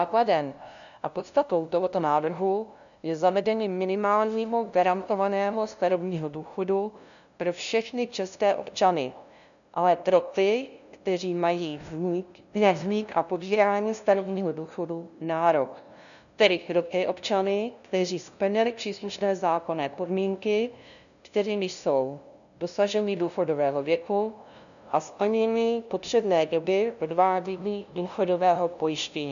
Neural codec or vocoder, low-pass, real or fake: codec, 16 kHz, 0.5 kbps, FunCodec, trained on LibriTTS, 25 frames a second; 7.2 kHz; fake